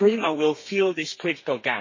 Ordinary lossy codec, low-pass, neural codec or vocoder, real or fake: MP3, 32 kbps; 7.2 kHz; codec, 32 kHz, 1.9 kbps, SNAC; fake